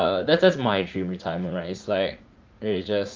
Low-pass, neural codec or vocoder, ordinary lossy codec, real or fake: 7.2 kHz; vocoder, 44.1 kHz, 80 mel bands, Vocos; Opus, 24 kbps; fake